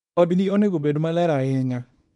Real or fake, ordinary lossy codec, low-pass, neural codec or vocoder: fake; none; 10.8 kHz; codec, 24 kHz, 0.9 kbps, WavTokenizer, small release